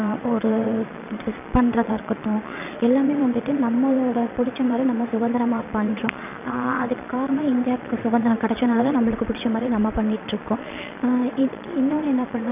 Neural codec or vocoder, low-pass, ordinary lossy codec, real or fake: vocoder, 22.05 kHz, 80 mel bands, Vocos; 3.6 kHz; none; fake